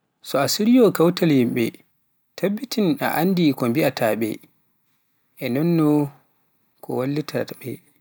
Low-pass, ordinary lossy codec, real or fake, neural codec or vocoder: none; none; real; none